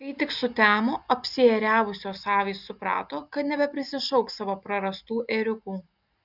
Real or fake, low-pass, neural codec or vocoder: real; 5.4 kHz; none